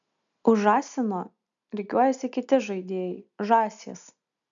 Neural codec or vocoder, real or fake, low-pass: none; real; 7.2 kHz